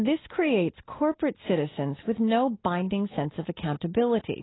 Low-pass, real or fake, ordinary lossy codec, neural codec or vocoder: 7.2 kHz; real; AAC, 16 kbps; none